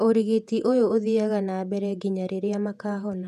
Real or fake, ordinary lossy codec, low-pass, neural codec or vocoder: fake; none; 14.4 kHz; vocoder, 48 kHz, 128 mel bands, Vocos